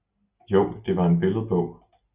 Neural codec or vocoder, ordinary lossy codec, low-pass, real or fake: none; Opus, 64 kbps; 3.6 kHz; real